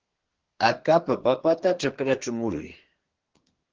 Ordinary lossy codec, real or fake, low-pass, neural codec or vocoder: Opus, 16 kbps; fake; 7.2 kHz; codec, 24 kHz, 1 kbps, SNAC